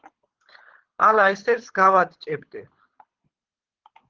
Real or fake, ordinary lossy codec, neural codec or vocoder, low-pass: fake; Opus, 16 kbps; codec, 24 kHz, 6 kbps, HILCodec; 7.2 kHz